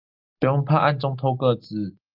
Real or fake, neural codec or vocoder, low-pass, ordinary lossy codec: real; none; 5.4 kHz; Opus, 32 kbps